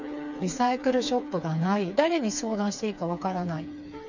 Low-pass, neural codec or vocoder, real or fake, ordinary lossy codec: 7.2 kHz; codec, 16 kHz, 4 kbps, FreqCodec, smaller model; fake; none